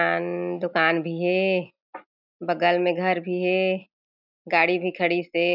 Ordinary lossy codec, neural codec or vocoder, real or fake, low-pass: none; none; real; 5.4 kHz